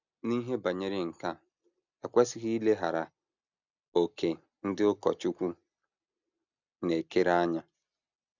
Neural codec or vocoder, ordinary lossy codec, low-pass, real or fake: none; none; 7.2 kHz; real